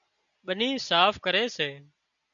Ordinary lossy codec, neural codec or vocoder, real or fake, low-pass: MP3, 96 kbps; none; real; 7.2 kHz